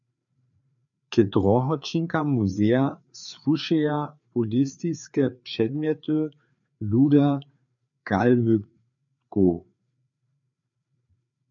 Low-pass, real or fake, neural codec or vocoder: 7.2 kHz; fake; codec, 16 kHz, 4 kbps, FreqCodec, larger model